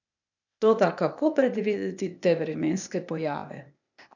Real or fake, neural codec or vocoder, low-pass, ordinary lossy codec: fake; codec, 16 kHz, 0.8 kbps, ZipCodec; 7.2 kHz; none